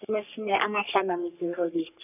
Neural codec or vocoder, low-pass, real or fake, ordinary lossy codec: codec, 44.1 kHz, 3.4 kbps, Pupu-Codec; 3.6 kHz; fake; none